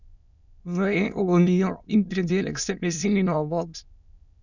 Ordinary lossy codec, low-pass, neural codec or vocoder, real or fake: none; 7.2 kHz; autoencoder, 22.05 kHz, a latent of 192 numbers a frame, VITS, trained on many speakers; fake